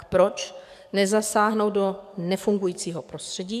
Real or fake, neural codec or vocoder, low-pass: fake; codec, 44.1 kHz, 7.8 kbps, DAC; 14.4 kHz